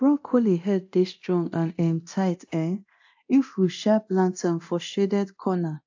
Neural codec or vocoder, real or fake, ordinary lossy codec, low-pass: codec, 24 kHz, 0.9 kbps, DualCodec; fake; AAC, 48 kbps; 7.2 kHz